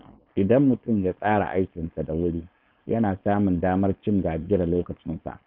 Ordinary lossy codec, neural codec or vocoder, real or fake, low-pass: none; codec, 16 kHz, 4.8 kbps, FACodec; fake; 5.4 kHz